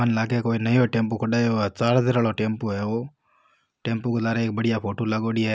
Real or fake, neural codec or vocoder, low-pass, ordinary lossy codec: real; none; none; none